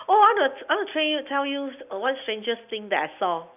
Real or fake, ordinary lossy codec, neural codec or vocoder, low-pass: real; none; none; 3.6 kHz